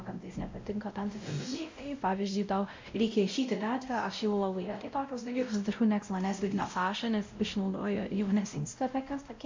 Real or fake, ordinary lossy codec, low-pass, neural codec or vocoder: fake; MP3, 64 kbps; 7.2 kHz; codec, 16 kHz, 0.5 kbps, X-Codec, WavLM features, trained on Multilingual LibriSpeech